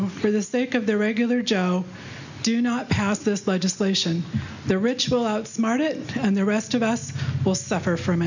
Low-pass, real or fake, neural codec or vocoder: 7.2 kHz; real; none